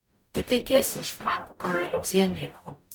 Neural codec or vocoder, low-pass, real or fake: codec, 44.1 kHz, 0.9 kbps, DAC; 19.8 kHz; fake